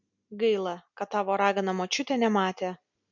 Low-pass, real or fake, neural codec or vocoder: 7.2 kHz; real; none